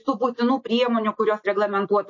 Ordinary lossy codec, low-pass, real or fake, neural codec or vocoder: MP3, 32 kbps; 7.2 kHz; real; none